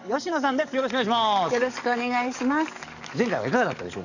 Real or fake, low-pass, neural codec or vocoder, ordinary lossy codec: fake; 7.2 kHz; codec, 44.1 kHz, 7.8 kbps, DAC; none